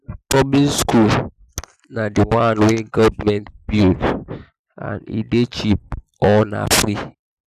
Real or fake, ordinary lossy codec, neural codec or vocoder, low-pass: real; none; none; 14.4 kHz